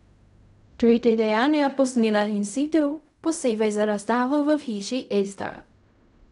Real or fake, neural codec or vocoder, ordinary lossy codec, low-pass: fake; codec, 16 kHz in and 24 kHz out, 0.4 kbps, LongCat-Audio-Codec, fine tuned four codebook decoder; none; 10.8 kHz